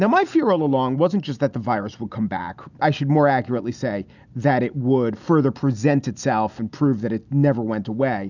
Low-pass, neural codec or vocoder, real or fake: 7.2 kHz; none; real